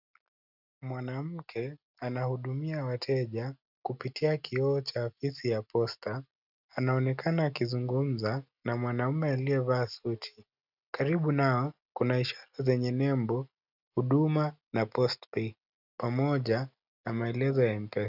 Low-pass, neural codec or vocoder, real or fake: 5.4 kHz; none; real